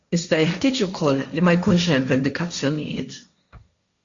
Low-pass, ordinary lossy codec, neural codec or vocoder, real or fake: 7.2 kHz; Opus, 64 kbps; codec, 16 kHz, 1.1 kbps, Voila-Tokenizer; fake